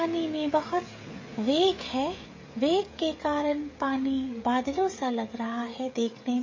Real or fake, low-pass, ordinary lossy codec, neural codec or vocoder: fake; 7.2 kHz; MP3, 32 kbps; codec, 16 kHz, 16 kbps, FreqCodec, smaller model